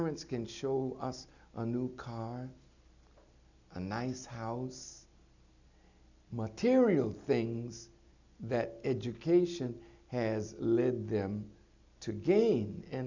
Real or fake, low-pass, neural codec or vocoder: real; 7.2 kHz; none